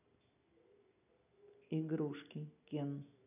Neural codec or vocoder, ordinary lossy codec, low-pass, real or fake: none; none; 3.6 kHz; real